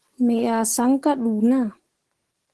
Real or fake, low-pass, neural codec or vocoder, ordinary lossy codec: fake; 10.8 kHz; codec, 44.1 kHz, 7.8 kbps, DAC; Opus, 16 kbps